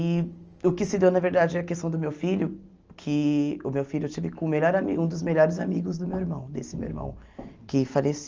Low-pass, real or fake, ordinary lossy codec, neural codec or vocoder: 7.2 kHz; real; Opus, 24 kbps; none